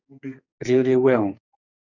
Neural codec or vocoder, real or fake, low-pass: codec, 44.1 kHz, 2.6 kbps, SNAC; fake; 7.2 kHz